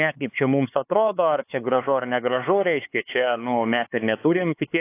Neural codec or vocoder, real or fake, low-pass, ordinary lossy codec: codec, 16 kHz, 4 kbps, X-Codec, HuBERT features, trained on LibriSpeech; fake; 3.6 kHz; AAC, 24 kbps